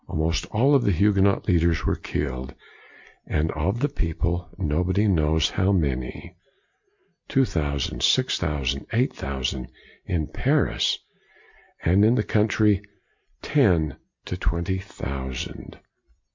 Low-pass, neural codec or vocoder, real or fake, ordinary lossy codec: 7.2 kHz; none; real; MP3, 48 kbps